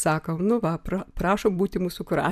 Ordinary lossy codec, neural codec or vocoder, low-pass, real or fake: MP3, 96 kbps; none; 14.4 kHz; real